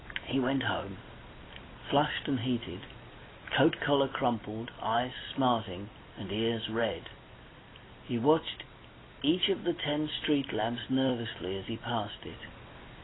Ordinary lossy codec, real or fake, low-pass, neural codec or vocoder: AAC, 16 kbps; real; 7.2 kHz; none